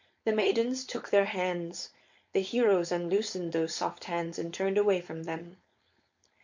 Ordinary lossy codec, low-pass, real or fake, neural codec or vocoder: MP3, 48 kbps; 7.2 kHz; fake; codec, 16 kHz, 4.8 kbps, FACodec